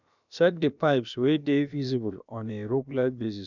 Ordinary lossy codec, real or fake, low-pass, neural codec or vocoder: none; fake; 7.2 kHz; codec, 16 kHz, about 1 kbps, DyCAST, with the encoder's durations